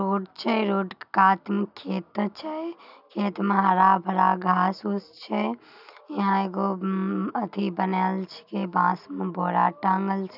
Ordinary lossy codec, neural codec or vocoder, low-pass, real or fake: none; none; 5.4 kHz; real